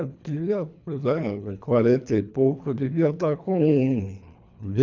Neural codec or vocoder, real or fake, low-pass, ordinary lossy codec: codec, 24 kHz, 3 kbps, HILCodec; fake; 7.2 kHz; none